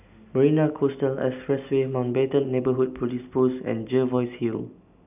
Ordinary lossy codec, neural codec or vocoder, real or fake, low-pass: none; none; real; 3.6 kHz